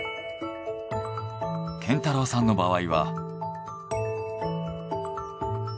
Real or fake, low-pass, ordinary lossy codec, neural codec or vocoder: real; none; none; none